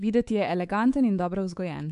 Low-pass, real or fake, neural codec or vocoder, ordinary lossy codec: 10.8 kHz; fake; codec, 24 kHz, 3.1 kbps, DualCodec; AAC, 64 kbps